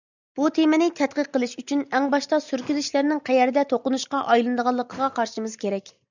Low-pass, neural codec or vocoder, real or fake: 7.2 kHz; none; real